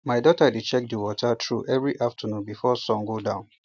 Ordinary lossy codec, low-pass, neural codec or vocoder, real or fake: none; none; none; real